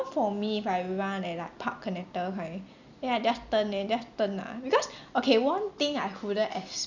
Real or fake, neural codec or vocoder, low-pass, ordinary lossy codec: real; none; 7.2 kHz; none